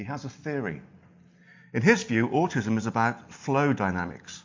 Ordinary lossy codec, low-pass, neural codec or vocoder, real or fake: MP3, 48 kbps; 7.2 kHz; none; real